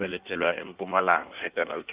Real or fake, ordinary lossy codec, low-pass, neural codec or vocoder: fake; Opus, 24 kbps; 3.6 kHz; codec, 16 kHz in and 24 kHz out, 1.1 kbps, FireRedTTS-2 codec